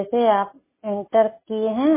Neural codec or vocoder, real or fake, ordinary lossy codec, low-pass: none; real; MP3, 16 kbps; 3.6 kHz